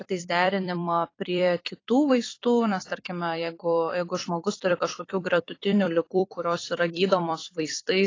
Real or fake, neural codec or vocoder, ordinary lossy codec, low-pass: fake; vocoder, 44.1 kHz, 128 mel bands every 256 samples, BigVGAN v2; AAC, 32 kbps; 7.2 kHz